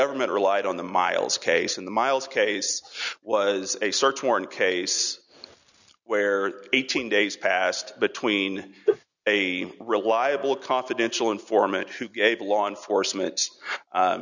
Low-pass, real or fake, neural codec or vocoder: 7.2 kHz; real; none